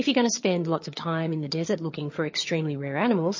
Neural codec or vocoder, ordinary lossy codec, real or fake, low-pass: vocoder, 22.05 kHz, 80 mel bands, HiFi-GAN; MP3, 32 kbps; fake; 7.2 kHz